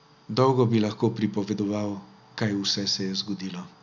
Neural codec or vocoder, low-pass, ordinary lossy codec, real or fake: none; 7.2 kHz; none; real